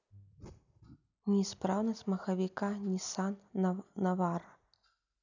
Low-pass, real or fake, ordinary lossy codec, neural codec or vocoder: 7.2 kHz; real; none; none